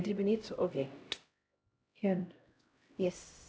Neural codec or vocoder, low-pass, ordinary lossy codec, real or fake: codec, 16 kHz, 0.5 kbps, X-Codec, HuBERT features, trained on LibriSpeech; none; none; fake